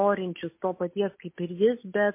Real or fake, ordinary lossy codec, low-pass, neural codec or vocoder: real; MP3, 24 kbps; 3.6 kHz; none